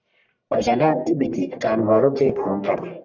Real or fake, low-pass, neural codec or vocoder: fake; 7.2 kHz; codec, 44.1 kHz, 1.7 kbps, Pupu-Codec